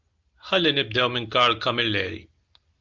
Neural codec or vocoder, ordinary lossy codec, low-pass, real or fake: none; Opus, 24 kbps; 7.2 kHz; real